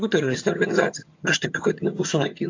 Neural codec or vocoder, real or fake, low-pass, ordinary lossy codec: vocoder, 22.05 kHz, 80 mel bands, HiFi-GAN; fake; 7.2 kHz; AAC, 48 kbps